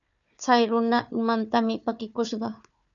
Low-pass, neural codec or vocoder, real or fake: 7.2 kHz; codec, 16 kHz, 4 kbps, FunCodec, trained on Chinese and English, 50 frames a second; fake